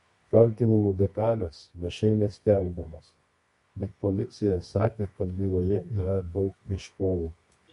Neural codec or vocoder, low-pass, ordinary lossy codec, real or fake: codec, 24 kHz, 0.9 kbps, WavTokenizer, medium music audio release; 10.8 kHz; MP3, 48 kbps; fake